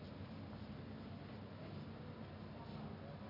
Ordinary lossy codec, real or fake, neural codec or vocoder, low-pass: MP3, 32 kbps; real; none; 5.4 kHz